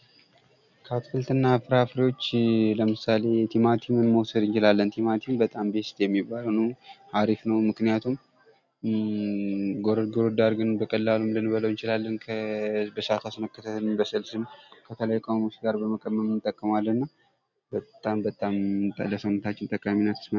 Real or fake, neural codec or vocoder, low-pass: real; none; 7.2 kHz